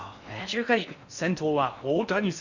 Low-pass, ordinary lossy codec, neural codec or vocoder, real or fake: 7.2 kHz; none; codec, 16 kHz in and 24 kHz out, 0.6 kbps, FocalCodec, streaming, 4096 codes; fake